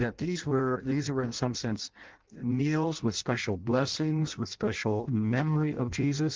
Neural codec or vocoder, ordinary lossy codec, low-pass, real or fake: codec, 16 kHz in and 24 kHz out, 0.6 kbps, FireRedTTS-2 codec; Opus, 16 kbps; 7.2 kHz; fake